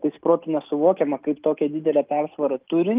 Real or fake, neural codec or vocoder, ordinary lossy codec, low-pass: real; none; Opus, 32 kbps; 3.6 kHz